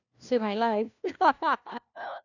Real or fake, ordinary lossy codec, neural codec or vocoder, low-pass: fake; none; codec, 16 kHz, 1 kbps, FunCodec, trained on LibriTTS, 50 frames a second; 7.2 kHz